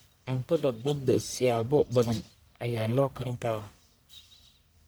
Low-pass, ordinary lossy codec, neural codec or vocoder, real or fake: none; none; codec, 44.1 kHz, 1.7 kbps, Pupu-Codec; fake